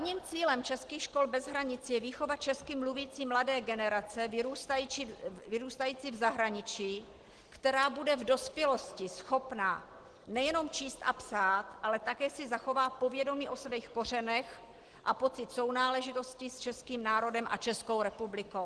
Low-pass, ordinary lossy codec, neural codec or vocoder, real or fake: 10.8 kHz; Opus, 16 kbps; none; real